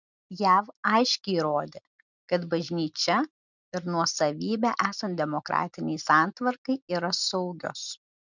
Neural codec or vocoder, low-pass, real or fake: none; 7.2 kHz; real